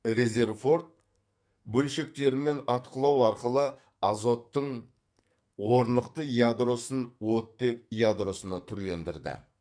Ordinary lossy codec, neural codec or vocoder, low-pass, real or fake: none; codec, 44.1 kHz, 2.6 kbps, SNAC; 9.9 kHz; fake